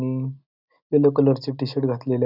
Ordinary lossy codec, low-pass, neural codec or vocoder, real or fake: none; 5.4 kHz; none; real